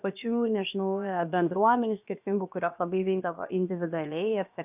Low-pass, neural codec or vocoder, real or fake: 3.6 kHz; codec, 16 kHz, about 1 kbps, DyCAST, with the encoder's durations; fake